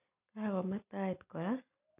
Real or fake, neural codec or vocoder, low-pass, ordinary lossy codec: real; none; 3.6 kHz; none